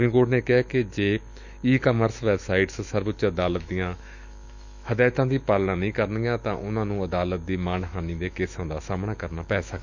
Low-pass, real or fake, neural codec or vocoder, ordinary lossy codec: 7.2 kHz; fake; autoencoder, 48 kHz, 128 numbers a frame, DAC-VAE, trained on Japanese speech; none